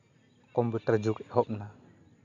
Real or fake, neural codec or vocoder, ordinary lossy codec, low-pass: real; none; none; 7.2 kHz